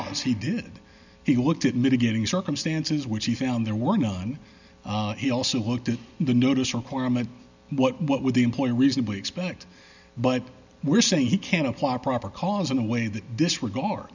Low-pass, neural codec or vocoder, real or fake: 7.2 kHz; none; real